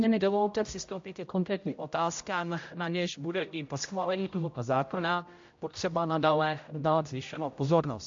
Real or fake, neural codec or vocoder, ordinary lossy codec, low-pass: fake; codec, 16 kHz, 0.5 kbps, X-Codec, HuBERT features, trained on general audio; MP3, 48 kbps; 7.2 kHz